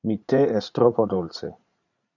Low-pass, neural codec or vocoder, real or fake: 7.2 kHz; vocoder, 44.1 kHz, 80 mel bands, Vocos; fake